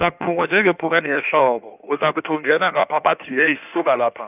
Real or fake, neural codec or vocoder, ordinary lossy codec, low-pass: fake; codec, 16 kHz in and 24 kHz out, 1.1 kbps, FireRedTTS-2 codec; none; 3.6 kHz